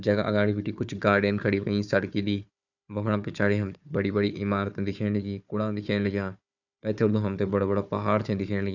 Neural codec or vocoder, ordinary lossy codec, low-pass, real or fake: codec, 16 kHz, 4 kbps, FunCodec, trained on Chinese and English, 50 frames a second; none; 7.2 kHz; fake